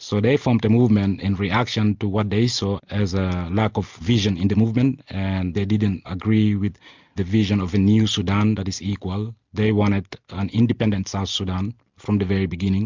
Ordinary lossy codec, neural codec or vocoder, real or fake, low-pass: MP3, 64 kbps; none; real; 7.2 kHz